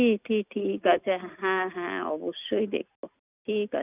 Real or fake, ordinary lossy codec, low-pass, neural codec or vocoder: real; none; 3.6 kHz; none